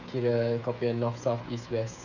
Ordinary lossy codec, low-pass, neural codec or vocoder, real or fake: none; 7.2 kHz; codec, 16 kHz, 8 kbps, FreqCodec, smaller model; fake